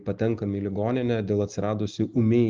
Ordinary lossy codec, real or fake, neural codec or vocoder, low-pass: Opus, 32 kbps; real; none; 7.2 kHz